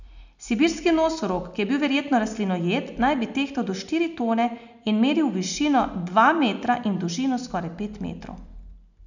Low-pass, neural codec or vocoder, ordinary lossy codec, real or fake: 7.2 kHz; none; none; real